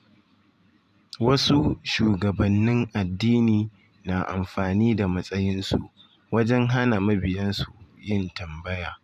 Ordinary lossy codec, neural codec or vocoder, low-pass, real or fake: Opus, 64 kbps; vocoder, 44.1 kHz, 128 mel bands every 512 samples, BigVGAN v2; 14.4 kHz; fake